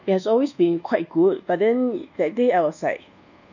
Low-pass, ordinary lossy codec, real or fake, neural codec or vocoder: 7.2 kHz; none; fake; codec, 24 kHz, 1.2 kbps, DualCodec